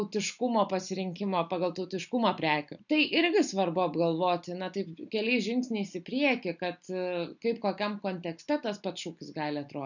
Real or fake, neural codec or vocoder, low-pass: real; none; 7.2 kHz